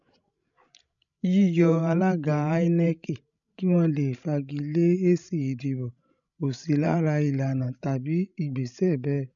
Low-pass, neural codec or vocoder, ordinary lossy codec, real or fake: 7.2 kHz; codec, 16 kHz, 16 kbps, FreqCodec, larger model; none; fake